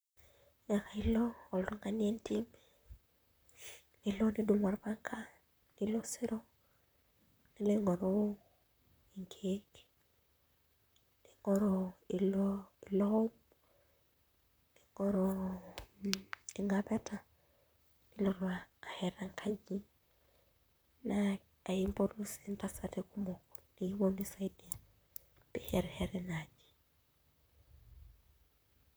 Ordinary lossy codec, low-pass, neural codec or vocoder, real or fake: none; none; vocoder, 44.1 kHz, 128 mel bands, Pupu-Vocoder; fake